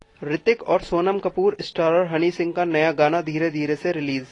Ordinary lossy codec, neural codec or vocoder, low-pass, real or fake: AAC, 32 kbps; none; 10.8 kHz; real